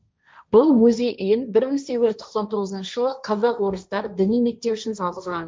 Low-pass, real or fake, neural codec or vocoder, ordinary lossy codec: none; fake; codec, 16 kHz, 1.1 kbps, Voila-Tokenizer; none